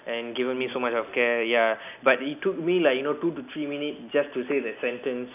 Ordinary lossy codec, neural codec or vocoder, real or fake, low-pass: none; none; real; 3.6 kHz